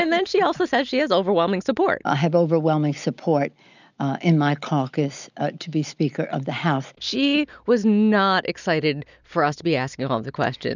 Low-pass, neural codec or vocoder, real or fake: 7.2 kHz; none; real